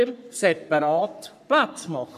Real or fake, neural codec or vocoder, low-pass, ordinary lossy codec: fake; codec, 44.1 kHz, 3.4 kbps, Pupu-Codec; 14.4 kHz; none